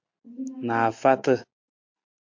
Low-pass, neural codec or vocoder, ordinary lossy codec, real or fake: 7.2 kHz; none; MP3, 48 kbps; real